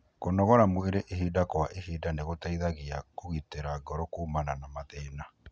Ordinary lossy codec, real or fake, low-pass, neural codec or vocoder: none; real; none; none